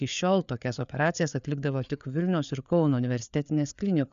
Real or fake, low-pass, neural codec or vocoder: fake; 7.2 kHz; codec, 16 kHz, 4 kbps, FreqCodec, larger model